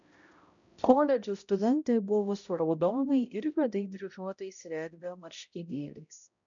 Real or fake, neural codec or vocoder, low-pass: fake; codec, 16 kHz, 0.5 kbps, X-Codec, HuBERT features, trained on balanced general audio; 7.2 kHz